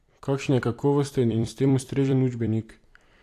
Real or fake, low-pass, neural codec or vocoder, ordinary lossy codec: fake; 14.4 kHz; vocoder, 44.1 kHz, 128 mel bands every 256 samples, BigVGAN v2; AAC, 64 kbps